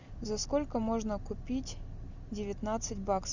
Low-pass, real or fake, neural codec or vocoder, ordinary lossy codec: 7.2 kHz; real; none; Opus, 64 kbps